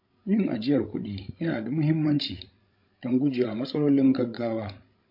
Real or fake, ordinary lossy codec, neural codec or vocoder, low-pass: fake; MP3, 32 kbps; codec, 16 kHz, 16 kbps, FreqCodec, larger model; 5.4 kHz